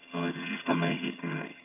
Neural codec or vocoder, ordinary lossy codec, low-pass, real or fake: vocoder, 22.05 kHz, 80 mel bands, HiFi-GAN; MP3, 32 kbps; 3.6 kHz; fake